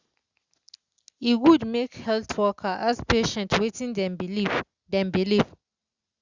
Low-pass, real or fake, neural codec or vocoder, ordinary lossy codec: 7.2 kHz; real; none; Opus, 64 kbps